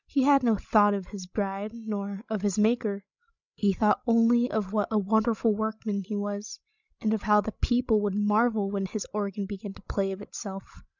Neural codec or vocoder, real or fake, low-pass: codec, 16 kHz, 16 kbps, FreqCodec, larger model; fake; 7.2 kHz